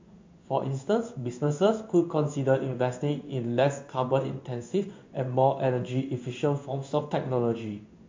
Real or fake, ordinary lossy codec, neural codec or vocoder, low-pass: fake; MP3, 48 kbps; codec, 16 kHz in and 24 kHz out, 1 kbps, XY-Tokenizer; 7.2 kHz